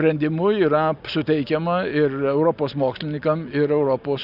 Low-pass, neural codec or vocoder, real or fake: 5.4 kHz; none; real